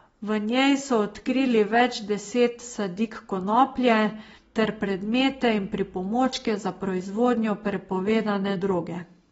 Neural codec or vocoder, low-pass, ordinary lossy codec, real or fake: none; 19.8 kHz; AAC, 24 kbps; real